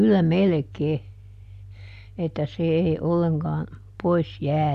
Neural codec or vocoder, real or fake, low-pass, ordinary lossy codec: none; real; 14.4 kHz; none